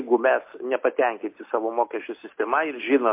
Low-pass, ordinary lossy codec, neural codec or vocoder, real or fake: 3.6 kHz; MP3, 24 kbps; none; real